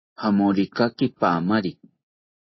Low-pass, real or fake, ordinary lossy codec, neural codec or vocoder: 7.2 kHz; real; MP3, 24 kbps; none